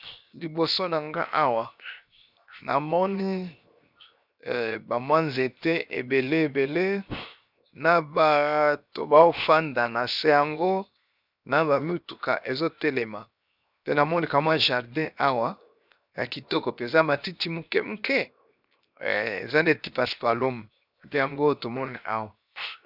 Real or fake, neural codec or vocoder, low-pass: fake; codec, 16 kHz, 0.7 kbps, FocalCodec; 5.4 kHz